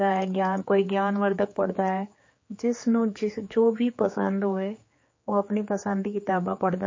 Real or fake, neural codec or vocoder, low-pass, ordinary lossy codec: fake; codec, 16 kHz, 4 kbps, X-Codec, HuBERT features, trained on general audio; 7.2 kHz; MP3, 32 kbps